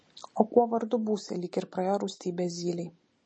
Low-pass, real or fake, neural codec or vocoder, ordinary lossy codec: 9.9 kHz; real; none; MP3, 32 kbps